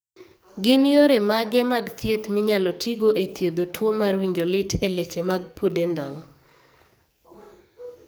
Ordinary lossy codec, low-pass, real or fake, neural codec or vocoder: none; none; fake; codec, 44.1 kHz, 2.6 kbps, SNAC